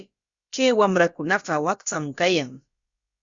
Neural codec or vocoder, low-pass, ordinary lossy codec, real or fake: codec, 16 kHz, about 1 kbps, DyCAST, with the encoder's durations; 7.2 kHz; Opus, 64 kbps; fake